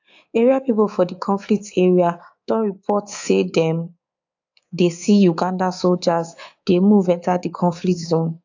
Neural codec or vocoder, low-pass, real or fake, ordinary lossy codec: codec, 24 kHz, 3.1 kbps, DualCodec; 7.2 kHz; fake; AAC, 48 kbps